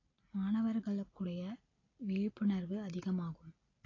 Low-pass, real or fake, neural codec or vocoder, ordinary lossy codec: 7.2 kHz; real; none; AAC, 32 kbps